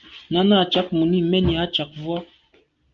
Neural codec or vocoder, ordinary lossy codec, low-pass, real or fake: none; Opus, 32 kbps; 7.2 kHz; real